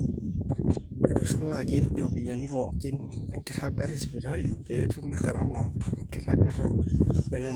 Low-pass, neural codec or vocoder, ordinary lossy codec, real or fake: none; codec, 44.1 kHz, 2.6 kbps, DAC; none; fake